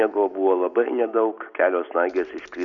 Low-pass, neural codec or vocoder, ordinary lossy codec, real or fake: 7.2 kHz; none; Opus, 64 kbps; real